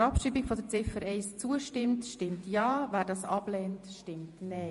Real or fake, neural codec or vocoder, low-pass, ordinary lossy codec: fake; vocoder, 48 kHz, 128 mel bands, Vocos; 14.4 kHz; MP3, 48 kbps